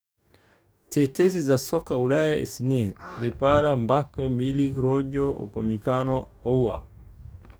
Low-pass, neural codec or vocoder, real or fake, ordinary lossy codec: none; codec, 44.1 kHz, 2.6 kbps, DAC; fake; none